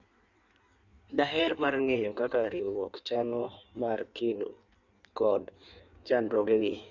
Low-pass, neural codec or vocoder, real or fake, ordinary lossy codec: 7.2 kHz; codec, 16 kHz in and 24 kHz out, 1.1 kbps, FireRedTTS-2 codec; fake; Opus, 64 kbps